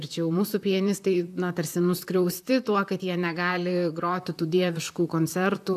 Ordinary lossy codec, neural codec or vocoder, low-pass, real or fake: AAC, 96 kbps; vocoder, 44.1 kHz, 128 mel bands, Pupu-Vocoder; 14.4 kHz; fake